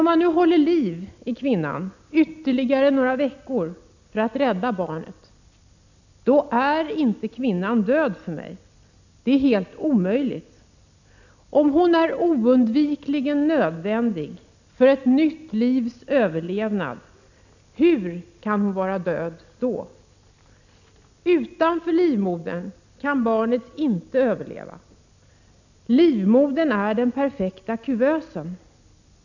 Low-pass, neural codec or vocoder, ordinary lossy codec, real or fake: 7.2 kHz; none; none; real